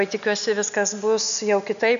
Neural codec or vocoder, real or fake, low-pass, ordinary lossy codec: none; real; 7.2 kHz; MP3, 96 kbps